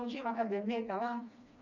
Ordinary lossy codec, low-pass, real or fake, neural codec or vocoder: none; 7.2 kHz; fake; codec, 16 kHz, 2 kbps, FreqCodec, smaller model